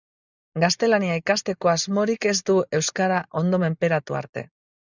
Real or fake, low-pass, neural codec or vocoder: real; 7.2 kHz; none